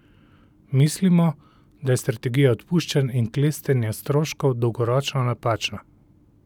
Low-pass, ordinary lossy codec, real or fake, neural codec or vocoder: 19.8 kHz; none; real; none